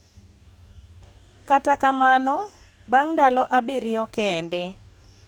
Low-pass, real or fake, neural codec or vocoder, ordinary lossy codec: 19.8 kHz; fake; codec, 44.1 kHz, 2.6 kbps, DAC; none